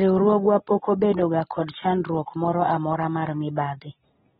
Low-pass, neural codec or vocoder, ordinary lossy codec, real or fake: 10.8 kHz; none; AAC, 16 kbps; real